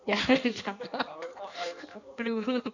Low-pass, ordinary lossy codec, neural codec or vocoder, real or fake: 7.2 kHz; none; codec, 16 kHz in and 24 kHz out, 1.1 kbps, FireRedTTS-2 codec; fake